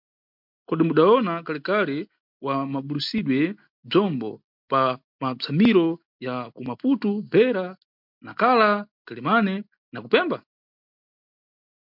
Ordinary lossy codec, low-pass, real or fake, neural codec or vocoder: MP3, 48 kbps; 5.4 kHz; real; none